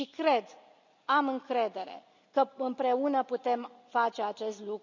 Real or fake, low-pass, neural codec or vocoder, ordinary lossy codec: real; 7.2 kHz; none; none